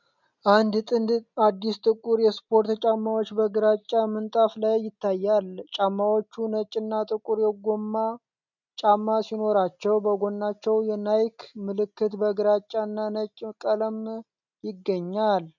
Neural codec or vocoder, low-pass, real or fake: none; 7.2 kHz; real